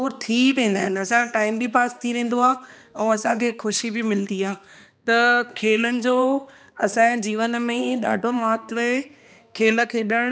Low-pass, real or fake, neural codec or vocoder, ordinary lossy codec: none; fake; codec, 16 kHz, 2 kbps, X-Codec, HuBERT features, trained on balanced general audio; none